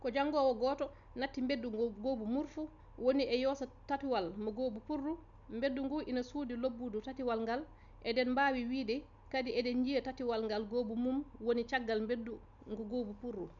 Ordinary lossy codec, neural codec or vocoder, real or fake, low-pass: none; none; real; 7.2 kHz